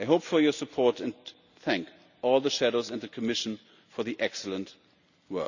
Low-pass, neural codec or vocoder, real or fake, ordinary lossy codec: 7.2 kHz; none; real; none